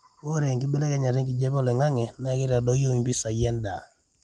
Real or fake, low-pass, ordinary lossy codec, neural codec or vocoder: real; 10.8 kHz; Opus, 24 kbps; none